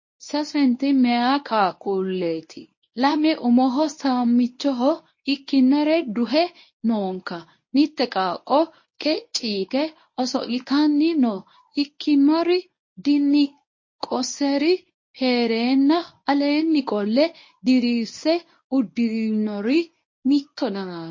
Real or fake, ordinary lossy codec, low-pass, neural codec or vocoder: fake; MP3, 32 kbps; 7.2 kHz; codec, 24 kHz, 0.9 kbps, WavTokenizer, medium speech release version 1